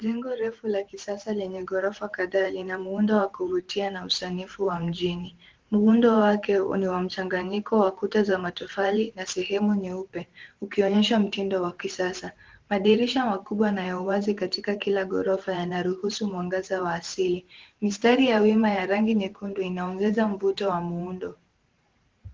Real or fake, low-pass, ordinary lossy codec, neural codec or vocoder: fake; 7.2 kHz; Opus, 16 kbps; vocoder, 44.1 kHz, 128 mel bands every 512 samples, BigVGAN v2